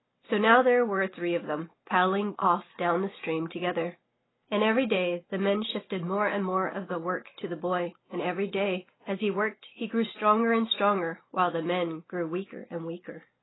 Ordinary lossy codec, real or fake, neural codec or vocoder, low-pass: AAC, 16 kbps; real; none; 7.2 kHz